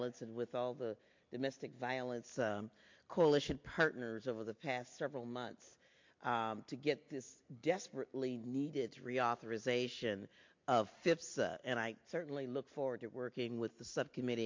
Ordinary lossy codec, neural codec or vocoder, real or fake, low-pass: MP3, 48 kbps; none; real; 7.2 kHz